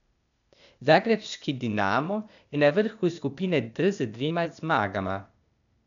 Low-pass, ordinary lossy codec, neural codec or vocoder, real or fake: 7.2 kHz; none; codec, 16 kHz, 0.8 kbps, ZipCodec; fake